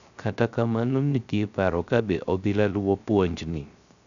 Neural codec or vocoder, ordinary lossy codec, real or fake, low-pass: codec, 16 kHz, 0.3 kbps, FocalCodec; none; fake; 7.2 kHz